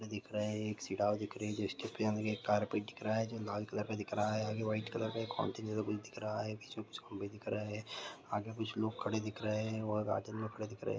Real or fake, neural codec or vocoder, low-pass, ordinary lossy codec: real; none; none; none